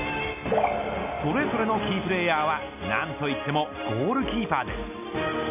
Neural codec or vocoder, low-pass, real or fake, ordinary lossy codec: none; 3.6 kHz; real; none